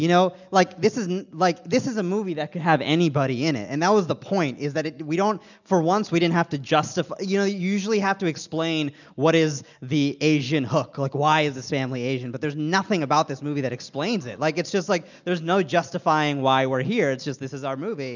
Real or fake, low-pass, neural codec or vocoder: real; 7.2 kHz; none